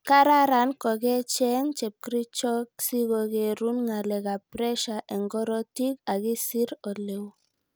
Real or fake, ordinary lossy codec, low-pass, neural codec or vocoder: real; none; none; none